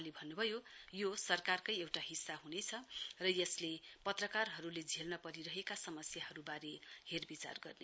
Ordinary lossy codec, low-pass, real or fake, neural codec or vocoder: none; none; real; none